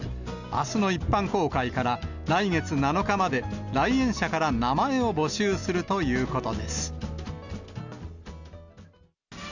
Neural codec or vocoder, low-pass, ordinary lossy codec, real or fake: none; 7.2 kHz; none; real